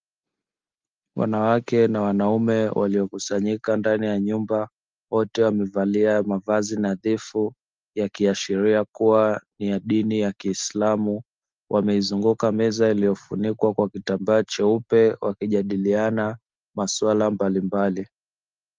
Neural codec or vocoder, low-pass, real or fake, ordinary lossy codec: none; 7.2 kHz; real; Opus, 32 kbps